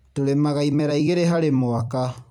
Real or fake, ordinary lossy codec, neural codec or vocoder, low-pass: fake; none; vocoder, 44.1 kHz, 128 mel bands every 256 samples, BigVGAN v2; 19.8 kHz